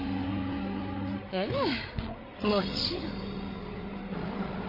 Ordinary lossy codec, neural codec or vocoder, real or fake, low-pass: MP3, 24 kbps; codec, 16 kHz, 16 kbps, FreqCodec, larger model; fake; 5.4 kHz